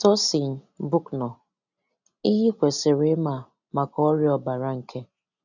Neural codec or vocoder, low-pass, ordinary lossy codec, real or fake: none; 7.2 kHz; none; real